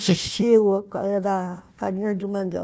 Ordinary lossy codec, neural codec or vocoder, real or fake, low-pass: none; codec, 16 kHz, 1 kbps, FunCodec, trained on Chinese and English, 50 frames a second; fake; none